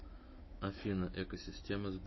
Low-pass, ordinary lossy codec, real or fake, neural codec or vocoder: 7.2 kHz; MP3, 24 kbps; fake; autoencoder, 48 kHz, 128 numbers a frame, DAC-VAE, trained on Japanese speech